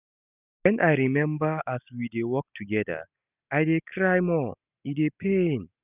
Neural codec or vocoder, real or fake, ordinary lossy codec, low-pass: none; real; none; 3.6 kHz